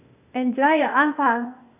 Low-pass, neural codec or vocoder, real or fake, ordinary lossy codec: 3.6 kHz; codec, 16 kHz, 0.8 kbps, ZipCodec; fake; none